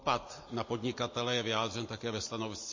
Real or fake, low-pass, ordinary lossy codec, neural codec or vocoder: real; 7.2 kHz; MP3, 32 kbps; none